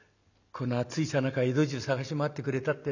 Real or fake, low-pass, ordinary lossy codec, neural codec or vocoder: real; 7.2 kHz; none; none